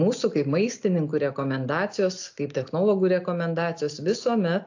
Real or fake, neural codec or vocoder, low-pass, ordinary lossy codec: real; none; 7.2 kHz; AAC, 48 kbps